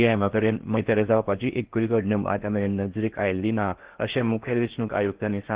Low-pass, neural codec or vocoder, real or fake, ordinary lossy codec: 3.6 kHz; codec, 16 kHz in and 24 kHz out, 0.6 kbps, FocalCodec, streaming, 4096 codes; fake; Opus, 16 kbps